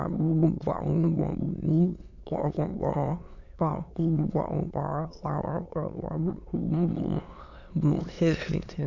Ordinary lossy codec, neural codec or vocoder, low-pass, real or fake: none; autoencoder, 22.05 kHz, a latent of 192 numbers a frame, VITS, trained on many speakers; 7.2 kHz; fake